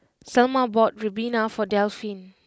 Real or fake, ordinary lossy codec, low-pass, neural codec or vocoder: real; none; none; none